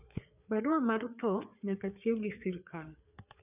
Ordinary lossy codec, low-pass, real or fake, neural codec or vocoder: none; 3.6 kHz; fake; codec, 16 kHz, 4 kbps, FreqCodec, larger model